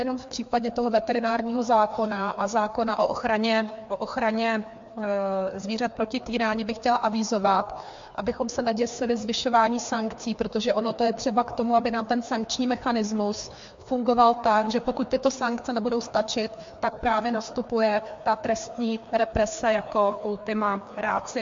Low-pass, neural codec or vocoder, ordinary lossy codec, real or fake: 7.2 kHz; codec, 16 kHz, 2 kbps, FreqCodec, larger model; MP3, 48 kbps; fake